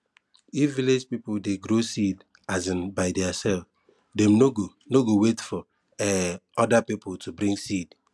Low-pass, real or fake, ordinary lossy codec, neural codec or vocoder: none; real; none; none